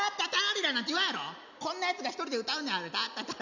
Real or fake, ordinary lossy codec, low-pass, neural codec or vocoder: real; none; 7.2 kHz; none